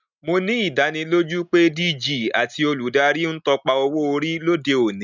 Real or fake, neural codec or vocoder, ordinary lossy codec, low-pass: real; none; none; 7.2 kHz